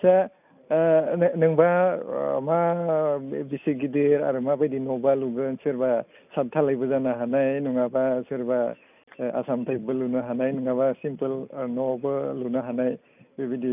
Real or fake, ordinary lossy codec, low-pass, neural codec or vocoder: real; none; 3.6 kHz; none